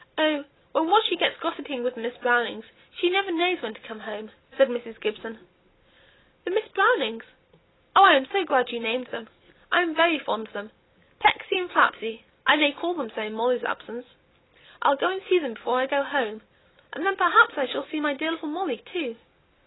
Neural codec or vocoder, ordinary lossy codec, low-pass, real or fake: none; AAC, 16 kbps; 7.2 kHz; real